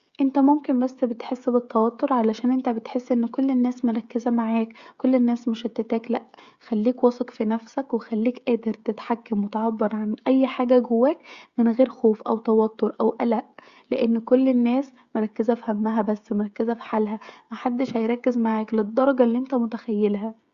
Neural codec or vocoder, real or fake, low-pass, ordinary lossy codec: codec, 16 kHz, 16 kbps, FreqCodec, smaller model; fake; 7.2 kHz; Opus, 64 kbps